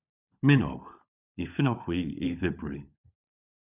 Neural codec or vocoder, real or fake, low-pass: codec, 16 kHz, 16 kbps, FunCodec, trained on LibriTTS, 50 frames a second; fake; 3.6 kHz